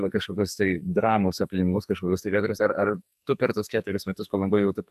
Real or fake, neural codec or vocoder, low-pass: fake; codec, 44.1 kHz, 2.6 kbps, SNAC; 14.4 kHz